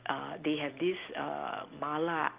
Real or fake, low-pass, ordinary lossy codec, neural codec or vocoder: real; 3.6 kHz; Opus, 32 kbps; none